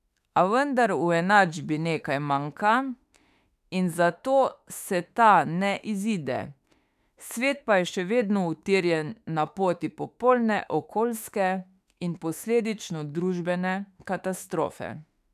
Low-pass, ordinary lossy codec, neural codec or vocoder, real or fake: 14.4 kHz; none; autoencoder, 48 kHz, 32 numbers a frame, DAC-VAE, trained on Japanese speech; fake